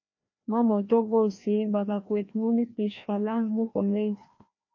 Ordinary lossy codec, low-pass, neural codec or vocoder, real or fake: AAC, 48 kbps; 7.2 kHz; codec, 16 kHz, 1 kbps, FreqCodec, larger model; fake